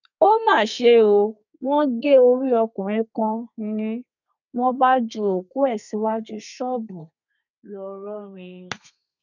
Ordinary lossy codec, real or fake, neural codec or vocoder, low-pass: none; fake; codec, 32 kHz, 1.9 kbps, SNAC; 7.2 kHz